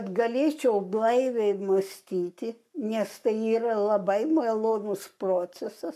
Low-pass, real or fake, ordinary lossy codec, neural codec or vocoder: 14.4 kHz; fake; MP3, 96 kbps; codec, 44.1 kHz, 7.8 kbps, Pupu-Codec